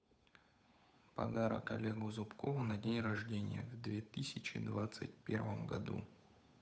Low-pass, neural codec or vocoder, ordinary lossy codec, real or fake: none; codec, 16 kHz, 8 kbps, FunCodec, trained on Chinese and English, 25 frames a second; none; fake